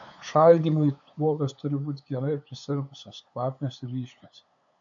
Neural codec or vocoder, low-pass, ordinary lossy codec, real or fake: codec, 16 kHz, 8 kbps, FunCodec, trained on LibriTTS, 25 frames a second; 7.2 kHz; AAC, 64 kbps; fake